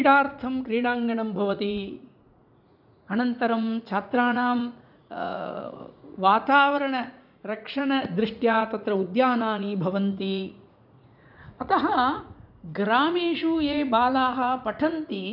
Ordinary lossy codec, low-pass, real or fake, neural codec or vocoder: none; 5.4 kHz; fake; vocoder, 44.1 kHz, 80 mel bands, Vocos